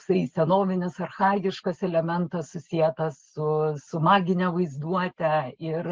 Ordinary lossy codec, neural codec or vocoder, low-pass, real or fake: Opus, 16 kbps; none; 7.2 kHz; real